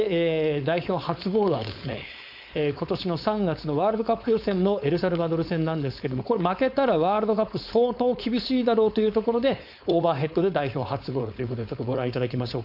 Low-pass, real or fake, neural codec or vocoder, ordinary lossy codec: 5.4 kHz; fake; codec, 16 kHz, 4.8 kbps, FACodec; none